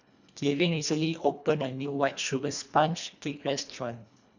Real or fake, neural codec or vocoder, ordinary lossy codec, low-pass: fake; codec, 24 kHz, 1.5 kbps, HILCodec; none; 7.2 kHz